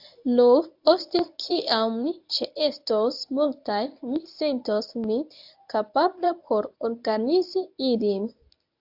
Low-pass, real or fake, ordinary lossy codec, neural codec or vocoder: 5.4 kHz; fake; AAC, 48 kbps; codec, 24 kHz, 0.9 kbps, WavTokenizer, medium speech release version 1